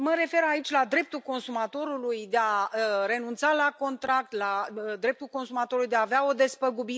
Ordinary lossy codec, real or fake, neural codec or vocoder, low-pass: none; real; none; none